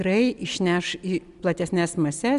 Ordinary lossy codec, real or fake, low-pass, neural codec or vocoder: MP3, 96 kbps; real; 10.8 kHz; none